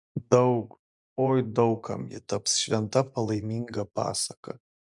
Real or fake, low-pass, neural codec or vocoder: fake; 10.8 kHz; vocoder, 24 kHz, 100 mel bands, Vocos